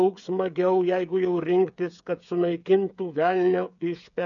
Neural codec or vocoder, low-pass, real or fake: codec, 16 kHz, 8 kbps, FreqCodec, smaller model; 7.2 kHz; fake